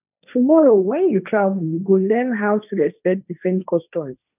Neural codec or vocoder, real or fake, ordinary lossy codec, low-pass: codec, 16 kHz, 1.1 kbps, Voila-Tokenizer; fake; none; 3.6 kHz